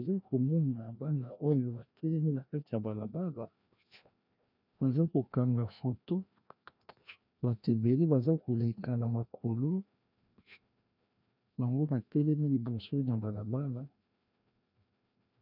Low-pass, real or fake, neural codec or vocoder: 5.4 kHz; fake; codec, 16 kHz, 1 kbps, FreqCodec, larger model